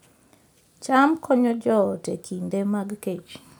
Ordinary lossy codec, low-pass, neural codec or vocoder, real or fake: none; none; none; real